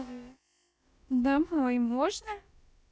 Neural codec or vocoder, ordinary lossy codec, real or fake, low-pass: codec, 16 kHz, about 1 kbps, DyCAST, with the encoder's durations; none; fake; none